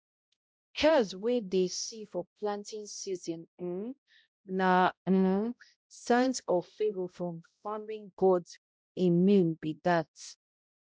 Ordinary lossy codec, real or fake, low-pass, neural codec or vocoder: none; fake; none; codec, 16 kHz, 0.5 kbps, X-Codec, HuBERT features, trained on balanced general audio